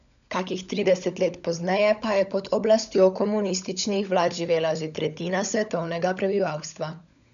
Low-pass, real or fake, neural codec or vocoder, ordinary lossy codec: 7.2 kHz; fake; codec, 16 kHz, 16 kbps, FunCodec, trained on LibriTTS, 50 frames a second; none